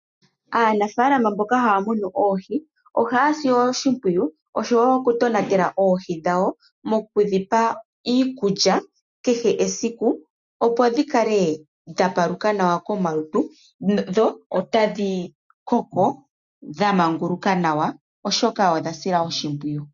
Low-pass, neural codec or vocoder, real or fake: 7.2 kHz; none; real